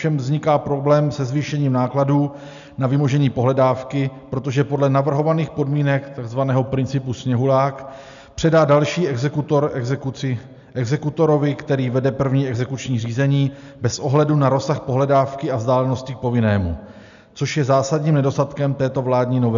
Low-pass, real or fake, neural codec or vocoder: 7.2 kHz; real; none